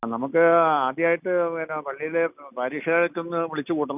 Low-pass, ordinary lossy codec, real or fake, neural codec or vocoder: 3.6 kHz; none; real; none